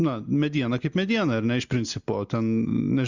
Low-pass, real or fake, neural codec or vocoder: 7.2 kHz; real; none